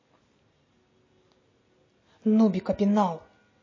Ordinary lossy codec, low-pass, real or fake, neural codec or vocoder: MP3, 32 kbps; 7.2 kHz; real; none